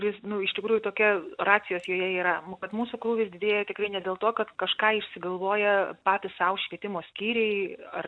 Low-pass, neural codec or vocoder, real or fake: 9.9 kHz; none; real